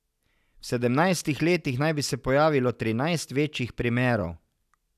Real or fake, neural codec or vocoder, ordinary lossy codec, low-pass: real; none; none; 14.4 kHz